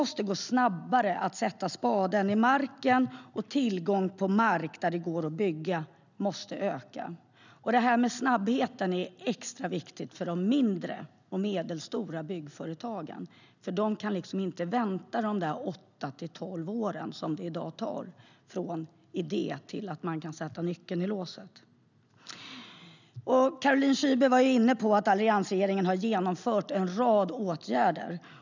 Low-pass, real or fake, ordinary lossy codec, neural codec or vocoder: 7.2 kHz; real; none; none